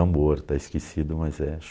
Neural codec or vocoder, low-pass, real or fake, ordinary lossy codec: none; none; real; none